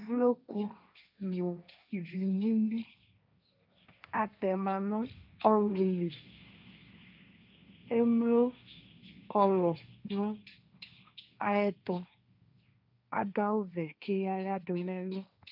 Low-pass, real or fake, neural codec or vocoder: 5.4 kHz; fake; codec, 16 kHz, 1.1 kbps, Voila-Tokenizer